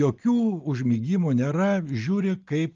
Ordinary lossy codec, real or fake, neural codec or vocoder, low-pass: Opus, 24 kbps; real; none; 7.2 kHz